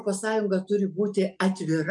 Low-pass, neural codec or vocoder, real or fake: 10.8 kHz; none; real